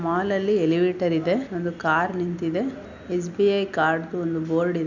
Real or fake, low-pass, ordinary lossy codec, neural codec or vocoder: real; 7.2 kHz; none; none